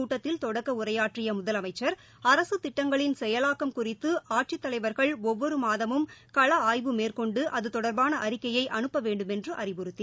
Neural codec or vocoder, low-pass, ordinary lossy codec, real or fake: none; none; none; real